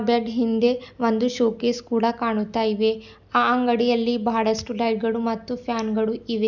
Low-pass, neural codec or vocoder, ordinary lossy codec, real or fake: 7.2 kHz; none; none; real